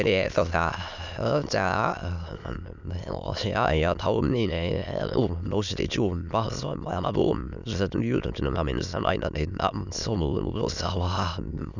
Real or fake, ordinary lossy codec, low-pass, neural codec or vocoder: fake; none; 7.2 kHz; autoencoder, 22.05 kHz, a latent of 192 numbers a frame, VITS, trained on many speakers